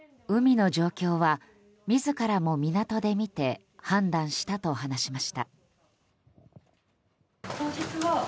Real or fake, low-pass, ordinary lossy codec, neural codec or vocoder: real; none; none; none